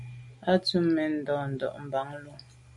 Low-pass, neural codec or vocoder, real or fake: 10.8 kHz; none; real